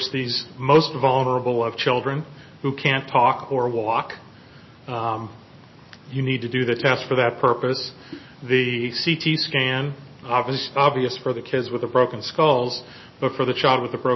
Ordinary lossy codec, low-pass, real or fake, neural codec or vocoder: MP3, 24 kbps; 7.2 kHz; real; none